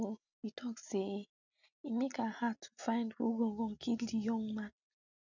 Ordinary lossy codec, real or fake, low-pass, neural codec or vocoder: none; real; 7.2 kHz; none